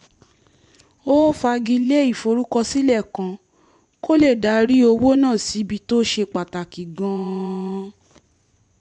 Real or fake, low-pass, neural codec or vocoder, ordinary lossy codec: fake; 10.8 kHz; vocoder, 24 kHz, 100 mel bands, Vocos; none